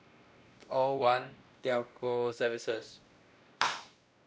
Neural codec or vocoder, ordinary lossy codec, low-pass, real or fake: codec, 16 kHz, 1 kbps, X-Codec, WavLM features, trained on Multilingual LibriSpeech; none; none; fake